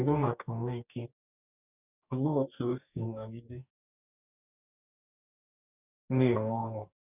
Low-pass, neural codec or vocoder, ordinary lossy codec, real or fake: 3.6 kHz; codec, 44.1 kHz, 2.6 kbps, DAC; none; fake